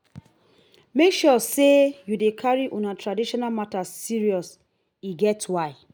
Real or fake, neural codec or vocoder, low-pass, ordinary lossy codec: real; none; none; none